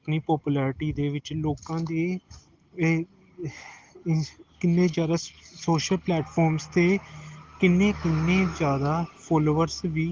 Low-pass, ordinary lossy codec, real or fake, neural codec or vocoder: 7.2 kHz; Opus, 16 kbps; real; none